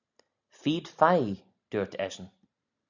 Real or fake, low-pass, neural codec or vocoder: real; 7.2 kHz; none